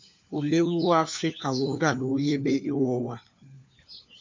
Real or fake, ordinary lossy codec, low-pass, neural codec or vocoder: fake; MP3, 64 kbps; 7.2 kHz; codec, 16 kHz, 4 kbps, FunCodec, trained on LibriTTS, 50 frames a second